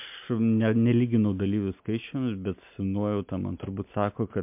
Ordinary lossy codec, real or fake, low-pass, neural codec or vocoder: MP3, 32 kbps; real; 3.6 kHz; none